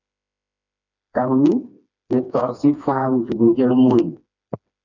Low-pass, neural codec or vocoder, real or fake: 7.2 kHz; codec, 16 kHz, 2 kbps, FreqCodec, smaller model; fake